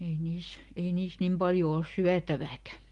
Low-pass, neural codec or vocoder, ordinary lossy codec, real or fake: 10.8 kHz; none; Opus, 24 kbps; real